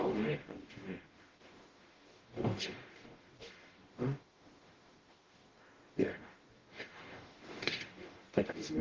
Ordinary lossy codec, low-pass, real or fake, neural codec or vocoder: Opus, 16 kbps; 7.2 kHz; fake; codec, 44.1 kHz, 0.9 kbps, DAC